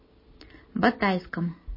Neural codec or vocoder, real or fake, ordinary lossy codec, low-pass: none; real; MP3, 24 kbps; 5.4 kHz